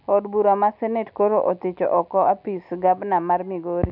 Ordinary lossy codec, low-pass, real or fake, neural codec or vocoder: none; 5.4 kHz; real; none